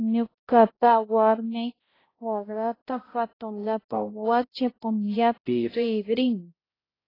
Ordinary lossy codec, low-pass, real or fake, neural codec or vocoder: AAC, 24 kbps; 5.4 kHz; fake; codec, 16 kHz, 0.5 kbps, X-Codec, HuBERT features, trained on balanced general audio